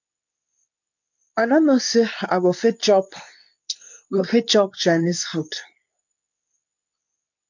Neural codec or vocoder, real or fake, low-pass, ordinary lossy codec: codec, 24 kHz, 0.9 kbps, WavTokenizer, medium speech release version 2; fake; 7.2 kHz; none